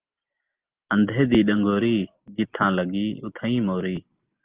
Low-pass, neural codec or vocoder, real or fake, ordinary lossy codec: 3.6 kHz; none; real; Opus, 32 kbps